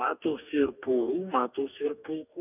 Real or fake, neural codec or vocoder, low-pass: fake; codec, 44.1 kHz, 2.6 kbps, DAC; 3.6 kHz